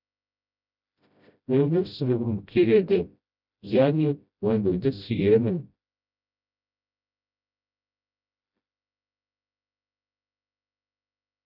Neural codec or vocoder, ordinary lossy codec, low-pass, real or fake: codec, 16 kHz, 0.5 kbps, FreqCodec, smaller model; none; 5.4 kHz; fake